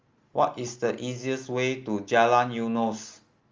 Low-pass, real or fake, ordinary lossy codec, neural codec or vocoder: 7.2 kHz; real; Opus, 32 kbps; none